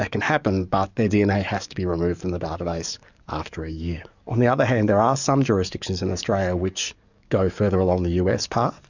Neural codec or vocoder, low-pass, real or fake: codec, 44.1 kHz, 7.8 kbps, DAC; 7.2 kHz; fake